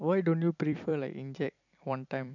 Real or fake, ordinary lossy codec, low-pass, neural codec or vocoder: fake; none; 7.2 kHz; vocoder, 44.1 kHz, 128 mel bands every 256 samples, BigVGAN v2